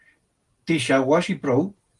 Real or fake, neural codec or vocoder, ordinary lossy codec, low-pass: real; none; Opus, 24 kbps; 10.8 kHz